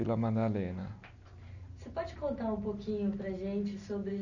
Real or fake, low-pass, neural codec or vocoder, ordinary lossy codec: real; 7.2 kHz; none; none